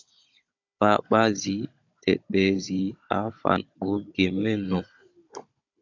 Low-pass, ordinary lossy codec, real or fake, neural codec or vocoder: 7.2 kHz; AAC, 48 kbps; fake; codec, 16 kHz, 16 kbps, FunCodec, trained on Chinese and English, 50 frames a second